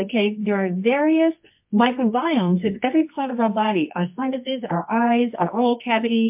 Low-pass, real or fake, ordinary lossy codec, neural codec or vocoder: 3.6 kHz; fake; MP3, 32 kbps; codec, 24 kHz, 0.9 kbps, WavTokenizer, medium music audio release